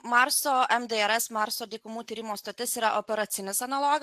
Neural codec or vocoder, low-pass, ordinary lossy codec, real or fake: none; 14.4 kHz; AAC, 96 kbps; real